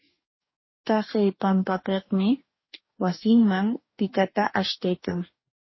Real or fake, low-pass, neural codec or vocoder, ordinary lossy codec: fake; 7.2 kHz; codec, 44.1 kHz, 2.6 kbps, DAC; MP3, 24 kbps